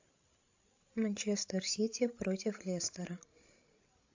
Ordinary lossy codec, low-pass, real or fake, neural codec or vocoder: AAC, 48 kbps; 7.2 kHz; fake; codec, 16 kHz, 16 kbps, FreqCodec, larger model